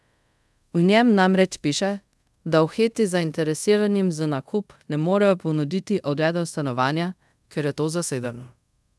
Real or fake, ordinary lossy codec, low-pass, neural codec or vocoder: fake; none; none; codec, 24 kHz, 0.5 kbps, DualCodec